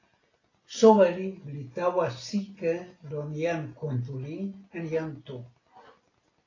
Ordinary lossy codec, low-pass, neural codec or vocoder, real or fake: AAC, 32 kbps; 7.2 kHz; none; real